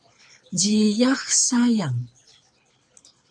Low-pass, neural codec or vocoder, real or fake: 9.9 kHz; codec, 24 kHz, 6 kbps, HILCodec; fake